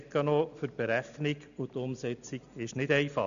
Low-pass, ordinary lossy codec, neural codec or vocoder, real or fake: 7.2 kHz; none; none; real